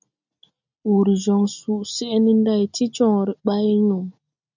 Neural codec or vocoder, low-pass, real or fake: none; 7.2 kHz; real